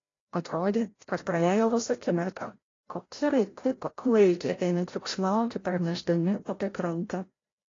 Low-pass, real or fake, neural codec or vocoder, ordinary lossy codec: 7.2 kHz; fake; codec, 16 kHz, 0.5 kbps, FreqCodec, larger model; AAC, 32 kbps